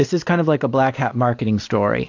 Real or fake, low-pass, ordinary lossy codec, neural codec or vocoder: real; 7.2 kHz; AAC, 48 kbps; none